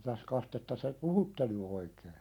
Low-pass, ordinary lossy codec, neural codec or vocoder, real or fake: 19.8 kHz; none; none; real